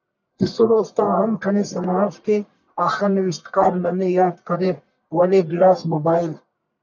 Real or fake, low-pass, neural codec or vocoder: fake; 7.2 kHz; codec, 44.1 kHz, 1.7 kbps, Pupu-Codec